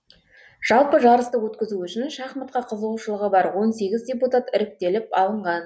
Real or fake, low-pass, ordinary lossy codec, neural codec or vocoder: real; none; none; none